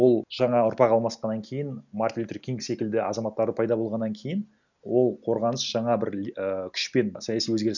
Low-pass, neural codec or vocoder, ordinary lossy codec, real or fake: 7.2 kHz; none; none; real